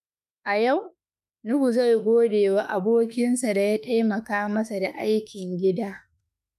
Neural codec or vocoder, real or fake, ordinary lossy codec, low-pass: autoencoder, 48 kHz, 32 numbers a frame, DAC-VAE, trained on Japanese speech; fake; none; 14.4 kHz